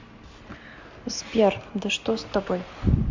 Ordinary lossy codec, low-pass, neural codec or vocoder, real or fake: MP3, 48 kbps; 7.2 kHz; none; real